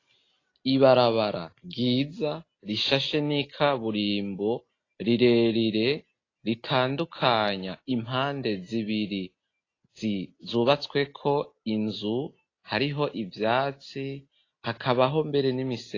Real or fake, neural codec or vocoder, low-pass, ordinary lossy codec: real; none; 7.2 kHz; AAC, 32 kbps